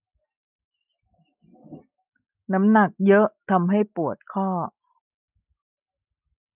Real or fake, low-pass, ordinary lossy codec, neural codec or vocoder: real; 3.6 kHz; none; none